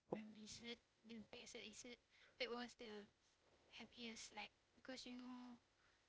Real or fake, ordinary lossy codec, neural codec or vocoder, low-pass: fake; none; codec, 16 kHz, 0.8 kbps, ZipCodec; none